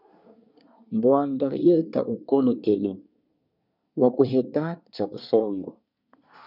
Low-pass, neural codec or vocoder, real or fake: 5.4 kHz; codec, 24 kHz, 1 kbps, SNAC; fake